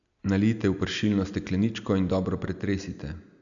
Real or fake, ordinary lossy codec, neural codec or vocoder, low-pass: real; none; none; 7.2 kHz